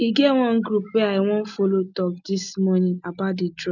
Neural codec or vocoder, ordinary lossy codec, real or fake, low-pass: none; none; real; 7.2 kHz